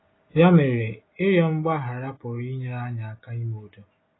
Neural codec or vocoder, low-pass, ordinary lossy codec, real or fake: none; 7.2 kHz; AAC, 16 kbps; real